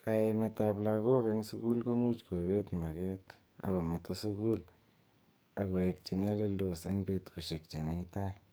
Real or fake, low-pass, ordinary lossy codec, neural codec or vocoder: fake; none; none; codec, 44.1 kHz, 2.6 kbps, SNAC